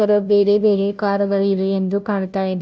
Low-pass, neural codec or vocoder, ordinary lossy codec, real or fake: none; codec, 16 kHz, 0.5 kbps, FunCodec, trained on Chinese and English, 25 frames a second; none; fake